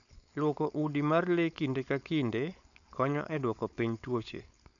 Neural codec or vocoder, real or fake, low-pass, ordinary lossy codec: codec, 16 kHz, 4.8 kbps, FACodec; fake; 7.2 kHz; none